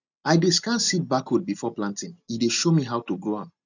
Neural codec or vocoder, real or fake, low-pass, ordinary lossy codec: none; real; 7.2 kHz; none